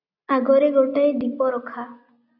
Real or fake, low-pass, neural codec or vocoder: real; 5.4 kHz; none